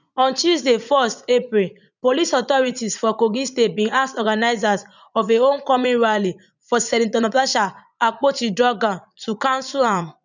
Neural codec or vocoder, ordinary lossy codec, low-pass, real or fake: vocoder, 44.1 kHz, 80 mel bands, Vocos; none; 7.2 kHz; fake